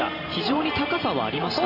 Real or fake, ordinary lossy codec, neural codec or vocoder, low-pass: fake; none; vocoder, 44.1 kHz, 128 mel bands every 512 samples, BigVGAN v2; 5.4 kHz